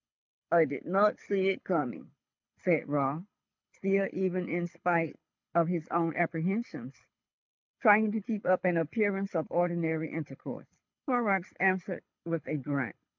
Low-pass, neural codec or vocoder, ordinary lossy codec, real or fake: 7.2 kHz; codec, 24 kHz, 6 kbps, HILCodec; MP3, 64 kbps; fake